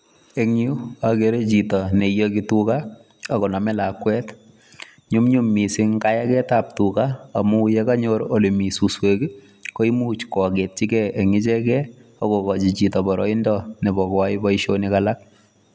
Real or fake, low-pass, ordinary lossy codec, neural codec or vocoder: real; none; none; none